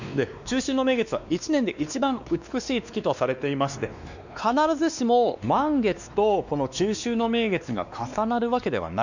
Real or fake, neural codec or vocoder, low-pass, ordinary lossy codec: fake; codec, 16 kHz, 2 kbps, X-Codec, WavLM features, trained on Multilingual LibriSpeech; 7.2 kHz; none